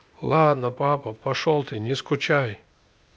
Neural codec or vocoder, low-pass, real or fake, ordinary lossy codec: codec, 16 kHz, 0.8 kbps, ZipCodec; none; fake; none